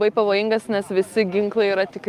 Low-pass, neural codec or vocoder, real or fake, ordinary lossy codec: 14.4 kHz; none; real; Opus, 32 kbps